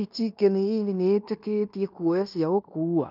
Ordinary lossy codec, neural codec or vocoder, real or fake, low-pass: none; codec, 16 kHz in and 24 kHz out, 0.9 kbps, LongCat-Audio-Codec, fine tuned four codebook decoder; fake; 5.4 kHz